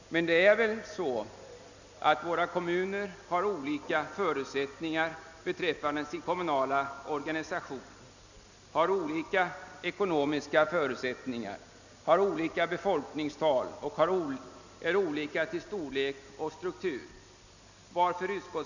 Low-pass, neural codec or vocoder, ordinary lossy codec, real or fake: 7.2 kHz; none; none; real